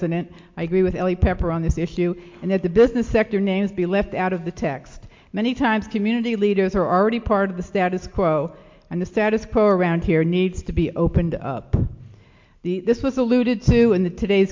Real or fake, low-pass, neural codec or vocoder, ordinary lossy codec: real; 7.2 kHz; none; MP3, 48 kbps